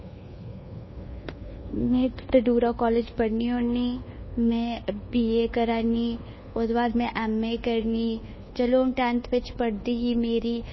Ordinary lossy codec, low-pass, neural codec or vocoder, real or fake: MP3, 24 kbps; 7.2 kHz; codec, 24 kHz, 1.2 kbps, DualCodec; fake